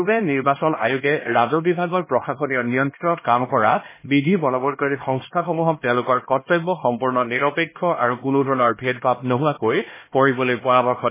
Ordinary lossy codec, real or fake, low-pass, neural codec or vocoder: MP3, 16 kbps; fake; 3.6 kHz; codec, 16 kHz, 1 kbps, X-Codec, HuBERT features, trained on LibriSpeech